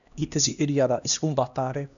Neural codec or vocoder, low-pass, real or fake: codec, 16 kHz, 1 kbps, X-Codec, HuBERT features, trained on LibriSpeech; 7.2 kHz; fake